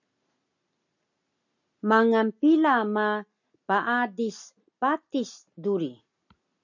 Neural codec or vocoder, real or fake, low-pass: none; real; 7.2 kHz